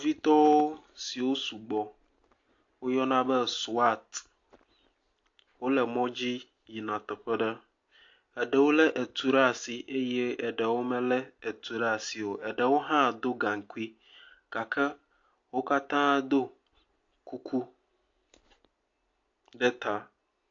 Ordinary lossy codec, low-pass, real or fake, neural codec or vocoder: AAC, 48 kbps; 7.2 kHz; real; none